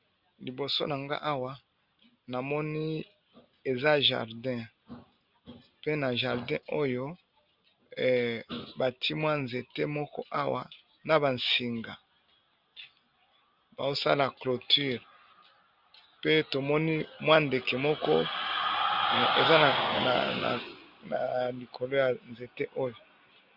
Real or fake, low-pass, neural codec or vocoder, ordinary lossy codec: real; 5.4 kHz; none; Opus, 64 kbps